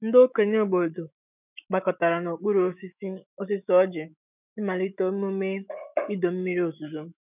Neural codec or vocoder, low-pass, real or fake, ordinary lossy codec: autoencoder, 48 kHz, 128 numbers a frame, DAC-VAE, trained on Japanese speech; 3.6 kHz; fake; none